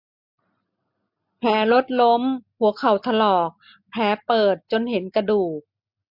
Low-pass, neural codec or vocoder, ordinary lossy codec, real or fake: 5.4 kHz; none; MP3, 48 kbps; real